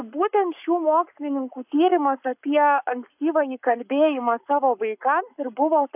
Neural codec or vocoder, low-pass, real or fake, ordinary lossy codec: codec, 44.1 kHz, 7.8 kbps, Pupu-Codec; 3.6 kHz; fake; AAC, 32 kbps